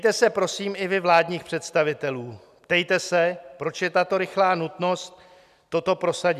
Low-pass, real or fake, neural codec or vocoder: 14.4 kHz; real; none